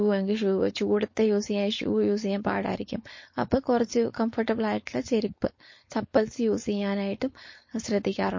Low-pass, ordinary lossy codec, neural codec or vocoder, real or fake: 7.2 kHz; MP3, 32 kbps; codec, 16 kHz, 4.8 kbps, FACodec; fake